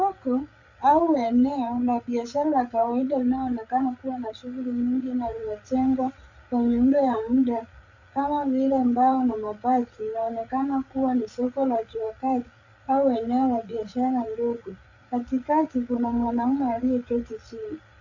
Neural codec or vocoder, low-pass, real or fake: codec, 16 kHz, 8 kbps, FreqCodec, larger model; 7.2 kHz; fake